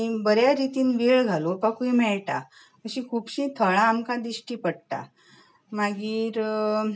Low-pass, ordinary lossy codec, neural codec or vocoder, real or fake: none; none; none; real